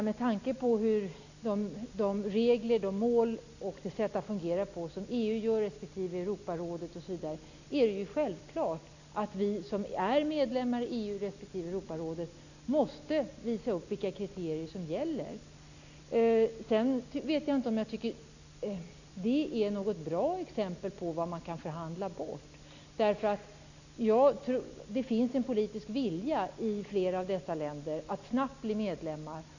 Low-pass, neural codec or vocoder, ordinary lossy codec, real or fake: 7.2 kHz; none; none; real